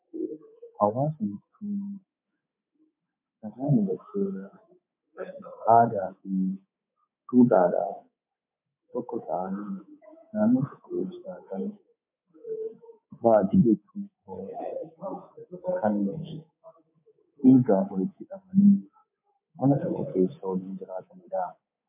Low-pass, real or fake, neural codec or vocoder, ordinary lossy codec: 3.6 kHz; fake; codec, 24 kHz, 3.1 kbps, DualCodec; MP3, 32 kbps